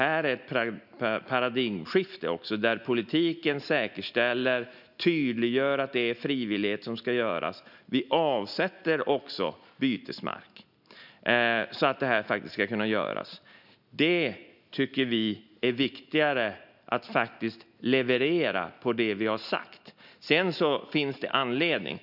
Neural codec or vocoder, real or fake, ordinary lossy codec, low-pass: none; real; none; 5.4 kHz